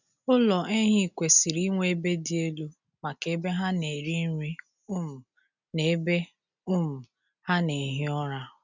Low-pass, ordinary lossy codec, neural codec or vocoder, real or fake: 7.2 kHz; none; none; real